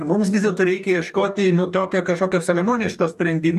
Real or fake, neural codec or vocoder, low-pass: fake; codec, 44.1 kHz, 2.6 kbps, DAC; 14.4 kHz